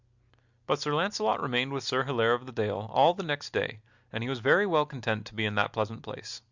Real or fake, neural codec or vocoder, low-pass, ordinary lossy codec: real; none; 7.2 kHz; Opus, 64 kbps